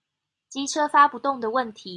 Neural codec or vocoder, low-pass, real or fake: none; 10.8 kHz; real